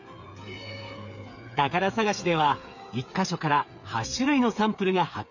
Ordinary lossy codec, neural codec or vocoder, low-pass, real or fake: none; codec, 16 kHz, 8 kbps, FreqCodec, smaller model; 7.2 kHz; fake